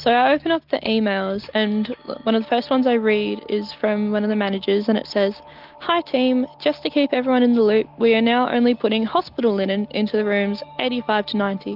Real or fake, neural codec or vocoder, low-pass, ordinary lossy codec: real; none; 5.4 kHz; Opus, 24 kbps